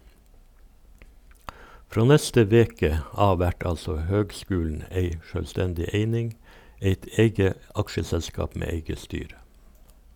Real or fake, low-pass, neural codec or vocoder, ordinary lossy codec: real; 19.8 kHz; none; none